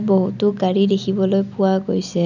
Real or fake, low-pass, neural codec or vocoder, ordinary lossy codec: real; 7.2 kHz; none; none